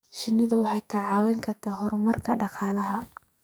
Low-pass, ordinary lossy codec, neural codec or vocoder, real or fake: none; none; codec, 44.1 kHz, 2.6 kbps, SNAC; fake